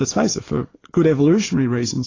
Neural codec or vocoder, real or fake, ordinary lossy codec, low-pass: none; real; AAC, 32 kbps; 7.2 kHz